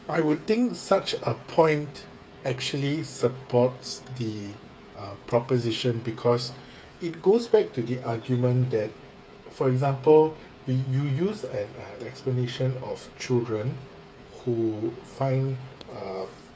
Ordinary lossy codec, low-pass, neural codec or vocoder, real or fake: none; none; codec, 16 kHz, 8 kbps, FreqCodec, smaller model; fake